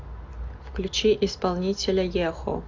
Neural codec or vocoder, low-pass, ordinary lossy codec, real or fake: none; 7.2 kHz; AAC, 48 kbps; real